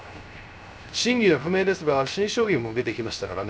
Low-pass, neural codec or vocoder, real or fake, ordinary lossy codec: none; codec, 16 kHz, 0.3 kbps, FocalCodec; fake; none